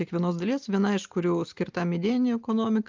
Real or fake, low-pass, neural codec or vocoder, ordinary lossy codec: real; 7.2 kHz; none; Opus, 24 kbps